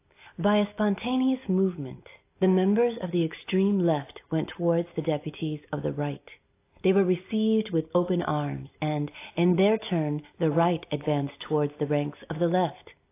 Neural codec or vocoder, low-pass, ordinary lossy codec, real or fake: none; 3.6 kHz; AAC, 24 kbps; real